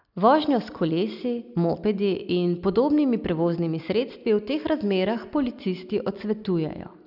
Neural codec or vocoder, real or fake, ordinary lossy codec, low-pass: none; real; none; 5.4 kHz